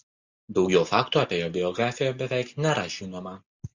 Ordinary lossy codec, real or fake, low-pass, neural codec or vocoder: Opus, 64 kbps; real; 7.2 kHz; none